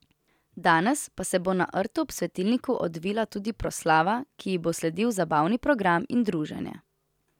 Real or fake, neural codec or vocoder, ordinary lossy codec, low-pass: real; none; none; 19.8 kHz